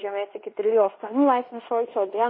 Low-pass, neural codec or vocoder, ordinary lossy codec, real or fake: 5.4 kHz; codec, 16 kHz in and 24 kHz out, 0.9 kbps, LongCat-Audio-Codec, fine tuned four codebook decoder; MP3, 24 kbps; fake